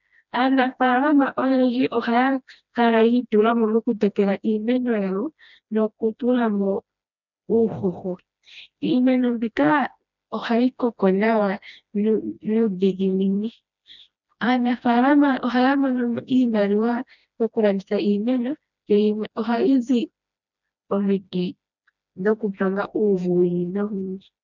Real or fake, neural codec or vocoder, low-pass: fake; codec, 16 kHz, 1 kbps, FreqCodec, smaller model; 7.2 kHz